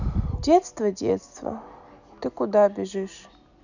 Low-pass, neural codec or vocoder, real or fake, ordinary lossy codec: 7.2 kHz; none; real; none